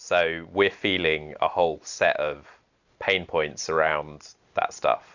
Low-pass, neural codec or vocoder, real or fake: 7.2 kHz; none; real